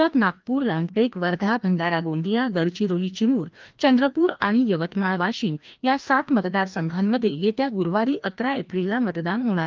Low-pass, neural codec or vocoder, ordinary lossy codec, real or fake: 7.2 kHz; codec, 16 kHz, 1 kbps, FreqCodec, larger model; Opus, 24 kbps; fake